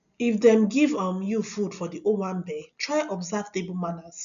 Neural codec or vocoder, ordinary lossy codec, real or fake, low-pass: none; none; real; 7.2 kHz